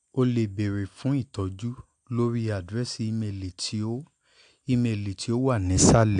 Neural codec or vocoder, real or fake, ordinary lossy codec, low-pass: none; real; AAC, 64 kbps; 9.9 kHz